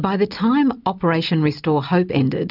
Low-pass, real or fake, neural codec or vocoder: 5.4 kHz; real; none